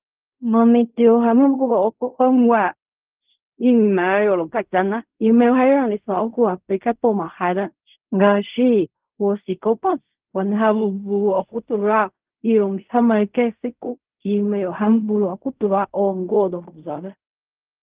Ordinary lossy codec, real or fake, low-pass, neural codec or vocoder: Opus, 32 kbps; fake; 3.6 kHz; codec, 16 kHz in and 24 kHz out, 0.4 kbps, LongCat-Audio-Codec, fine tuned four codebook decoder